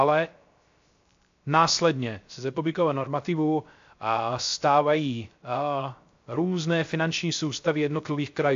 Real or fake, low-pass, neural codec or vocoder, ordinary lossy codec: fake; 7.2 kHz; codec, 16 kHz, 0.3 kbps, FocalCodec; AAC, 64 kbps